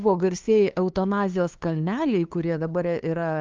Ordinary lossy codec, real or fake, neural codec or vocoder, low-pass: Opus, 24 kbps; fake; codec, 16 kHz, 2 kbps, FunCodec, trained on LibriTTS, 25 frames a second; 7.2 kHz